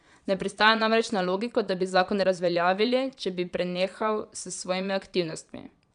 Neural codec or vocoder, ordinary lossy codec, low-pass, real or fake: vocoder, 22.05 kHz, 80 mel bands, Vocos; none; 9.9 kHz; fake